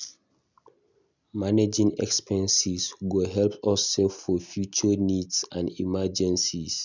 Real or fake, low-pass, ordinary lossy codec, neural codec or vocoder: real; 7.2 kHz; none; none